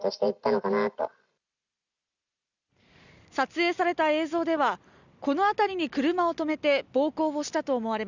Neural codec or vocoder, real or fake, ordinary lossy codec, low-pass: none; real; none; 7.2 kHz